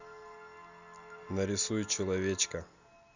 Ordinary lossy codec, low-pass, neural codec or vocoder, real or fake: Opus, 64 kbps; 7.2 kHz; none; real